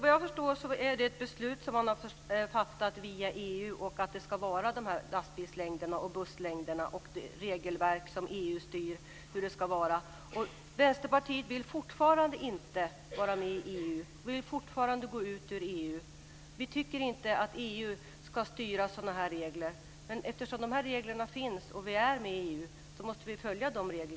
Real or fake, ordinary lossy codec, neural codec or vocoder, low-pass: real; none; none; none